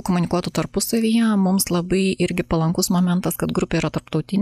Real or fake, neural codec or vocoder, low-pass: real; none; 14.4 kHz